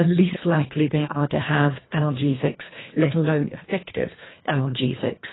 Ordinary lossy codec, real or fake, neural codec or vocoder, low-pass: AAC, 16 kbps; fake; codec, 24 kHz, 1.5 kbps, HILCodec; 7.2 kHz